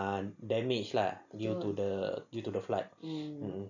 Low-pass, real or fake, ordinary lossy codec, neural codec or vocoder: 7.2 kHz; real; none; none